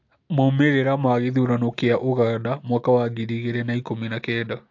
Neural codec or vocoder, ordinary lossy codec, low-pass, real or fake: none; AAC, 48 kbps; 7.2 kHz; real